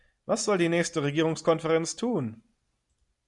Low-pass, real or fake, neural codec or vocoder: 10.8 kHz; real; none